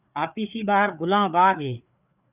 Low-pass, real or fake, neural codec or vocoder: 3.6 kHz; fake; codec, 16 kHz, 4 kbps, FreqCodec, larger model